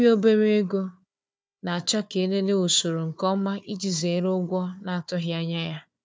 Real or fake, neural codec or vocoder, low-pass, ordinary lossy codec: fake; codec, 16 kHz, 4 kbps, FunCodec, trained on Chinese and English, 50 frames a second; none; none